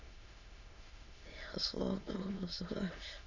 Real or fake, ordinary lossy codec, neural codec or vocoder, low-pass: fake; none; autoencoder, 22.05 kHz, a latent of 192 numbers a frame, VITS, trained on many speakers; 7.2 kHz